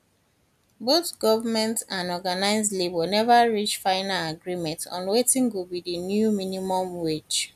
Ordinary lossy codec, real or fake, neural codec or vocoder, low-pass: none; real; none; 14.4 kHz